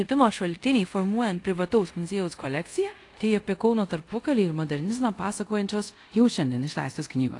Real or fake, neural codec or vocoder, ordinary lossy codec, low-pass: fake; codec, 24 kHz, 0.5 kbps, DualCodec; AAC, 48 kbps; 10.8 kHz